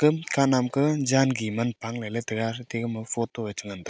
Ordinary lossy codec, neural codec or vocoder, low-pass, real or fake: none; none; none; real